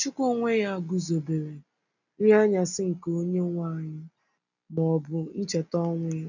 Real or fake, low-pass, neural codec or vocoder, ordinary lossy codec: real; 7.2 kHz; none; none